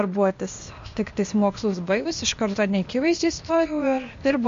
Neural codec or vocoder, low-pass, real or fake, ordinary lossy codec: codec, 16 kHz, 0.8 kbps, ZipCodec; 7.2 kHz; fake; MP3, 48 kbps